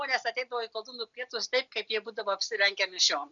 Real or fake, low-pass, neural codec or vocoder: real; 7.2 kHz; none